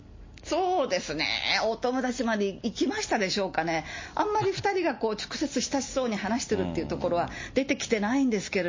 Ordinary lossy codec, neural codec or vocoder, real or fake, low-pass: MP3, 32 kbps; none; real; 7.2 kHz